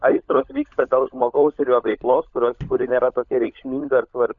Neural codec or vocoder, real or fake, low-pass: codec, 16 kHz, 16 kbps, FunCodec, trained on LibriTTS, 50 frames a second; fake; 7.2 kHz